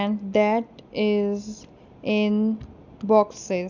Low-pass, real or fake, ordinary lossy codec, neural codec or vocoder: 7.2 kHz; real; none; none